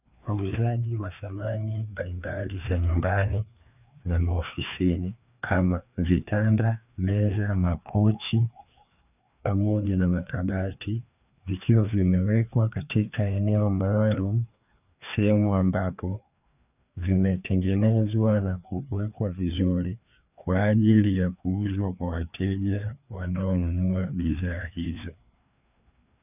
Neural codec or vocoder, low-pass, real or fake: codec, 16 kHz, 2 kbps, FreqCodec, larger model; 3.6 kHz; fake